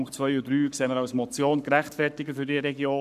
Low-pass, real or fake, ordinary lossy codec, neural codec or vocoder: 14.4 kHz; fake; none; codec, 44.1 kHz, 7.8 kbps, Pupu-Codec